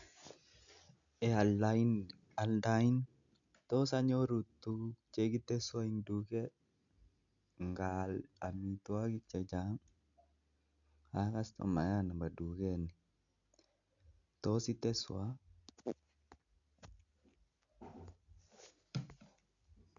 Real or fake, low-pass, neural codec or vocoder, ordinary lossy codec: real; 7.2 kHz; none; none